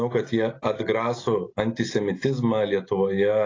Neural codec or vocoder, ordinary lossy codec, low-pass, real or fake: none; AAC, 32 kbps; 7.2 kHz; real